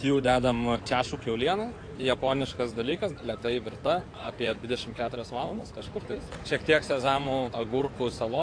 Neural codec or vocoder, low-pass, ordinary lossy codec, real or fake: codec, 16 kHz in and 24 kHz out, 2.2 kbps, FireRedTTS-2 codec; 9.9 kHz; AAC, 48 kbps; fake